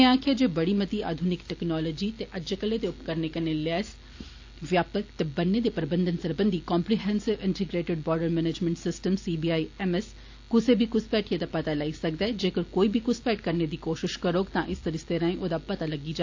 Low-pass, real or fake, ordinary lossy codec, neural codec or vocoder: 7.2 kHz; real; AAC, 48 kbps; none